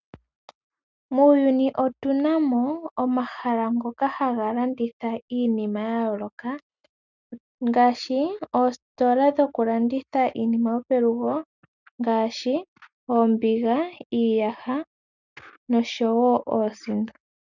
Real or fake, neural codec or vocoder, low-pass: real; none; 7.2 kHz